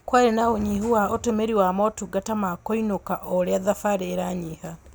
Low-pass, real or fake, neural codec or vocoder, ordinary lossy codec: none; real; none; none